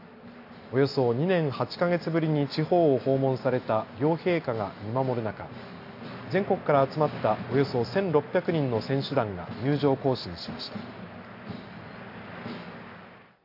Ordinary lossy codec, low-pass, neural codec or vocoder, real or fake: AAC, 32 kbps; 5.4 kHz; none; real